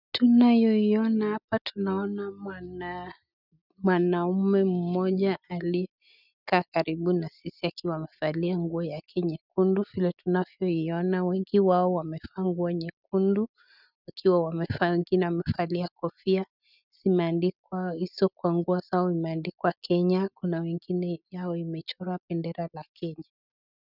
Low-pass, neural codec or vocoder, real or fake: 5.4 kHz; none; real